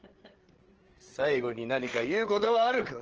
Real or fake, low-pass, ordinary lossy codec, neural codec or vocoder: fake; 7.2 kHz; Opus, 16 kbps; codec, 16 kHz, 8 kbps, FreqCodec, larger model